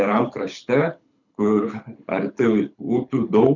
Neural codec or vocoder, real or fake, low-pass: codec, 24 kHz, 6 kbps, HILCodec; fake; 7.2 kHz